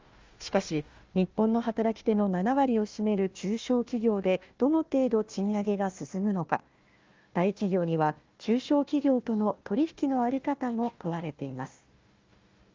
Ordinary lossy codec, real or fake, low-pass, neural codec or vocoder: Opus, 32 kbps; fake; 7.2 kHz; codec, 16 kHz, 1 kbps, FunCodec, trained on Chinese and English, 50 frames a second